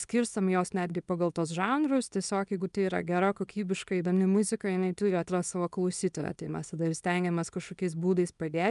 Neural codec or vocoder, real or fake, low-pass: codec, 24 kHz, 0.9 kbps, WavTokenizer, small release; fake; 10.8 kHz